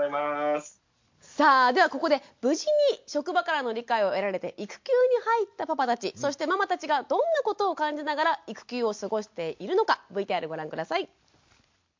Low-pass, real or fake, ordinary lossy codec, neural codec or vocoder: 7.2 kHz; real; MP3, 48 kbps; none